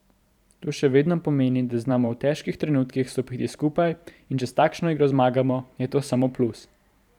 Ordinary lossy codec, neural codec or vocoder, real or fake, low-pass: none; vocoder, 44.1 kHz, 128 mel bands every 512 samples, BigVGAN v2; fake; 19.8 kHz